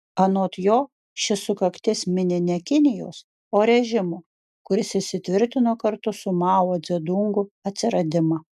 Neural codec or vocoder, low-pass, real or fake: none; 14.4 kHz; real